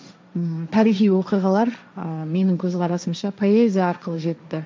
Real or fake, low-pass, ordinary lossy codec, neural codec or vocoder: fake; none; none; codec, 16 kHz, 1.1 kbps, Voila-Tokenizer